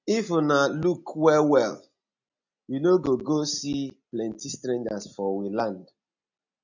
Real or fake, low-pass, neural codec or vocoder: real; 7.2 kHz; none